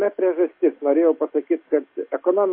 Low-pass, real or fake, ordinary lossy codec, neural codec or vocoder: 5.4 kHz; real; MP3, 24 kbps; none